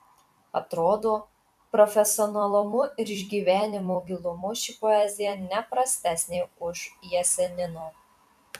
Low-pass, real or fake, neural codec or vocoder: 14.4 kHz; fake; vocoder, 44.1 kHz, 128 mel bands every 256 samples, BigVGAN v2